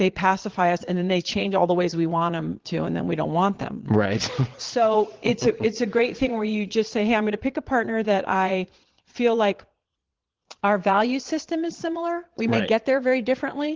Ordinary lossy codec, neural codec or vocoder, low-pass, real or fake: Opus, 16 kbps; vocoder, 22.05 kHz, 80 mel bands, Vocos; 7.2 kHz; fake